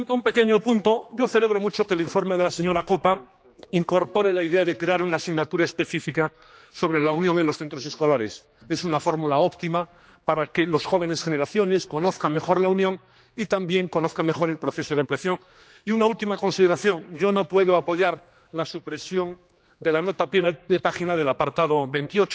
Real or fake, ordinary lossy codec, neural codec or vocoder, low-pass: fake; none; codec, 16 kHz, 2 kbps, X-Codec, HuBERT features, trained on general audio; none